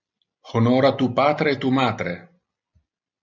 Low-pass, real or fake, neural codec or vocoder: 7.2 kHz; real; none